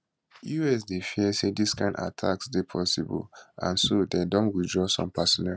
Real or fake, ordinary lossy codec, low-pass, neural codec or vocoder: real; none; none; none